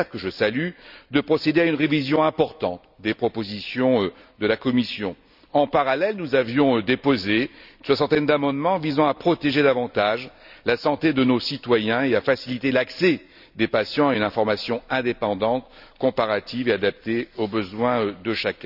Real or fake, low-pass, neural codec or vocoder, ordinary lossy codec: real; 5.4 kHz; none; none